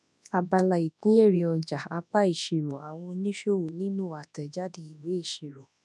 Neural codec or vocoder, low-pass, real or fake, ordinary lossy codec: codec, 24 kHz, 0.9 kbps, WavTokenizer, large speech release; none; fake; none